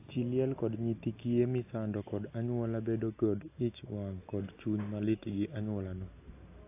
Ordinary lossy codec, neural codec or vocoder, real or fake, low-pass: AAC, 24 kbps; none; real; 3.6 kHz